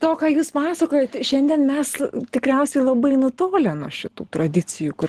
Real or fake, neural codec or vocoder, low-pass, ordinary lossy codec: real; none; 14.4 kHz; Opus, 16 kbps